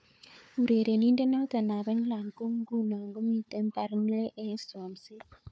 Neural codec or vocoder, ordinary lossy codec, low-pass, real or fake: codec, 16 kHz, 4 kbps, FunCodec, trained on Chinese and English, 50 frames a second; none; none; fake